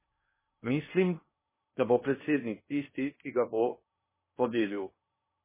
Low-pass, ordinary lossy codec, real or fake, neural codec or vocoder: 3.6 kHz; MP3, 16 kbps; fake; codec, 16 kHz in and 24 kHz out, 0.6 kbps, FocalCodec, streaming, 2048 codes